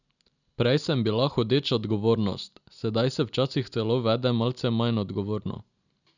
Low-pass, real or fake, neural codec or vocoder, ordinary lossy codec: 7.2 kHz; real; none; none